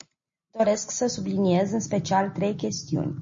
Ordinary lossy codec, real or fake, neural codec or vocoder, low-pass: MP3, 32 kbps; real; none; 7.2 kHz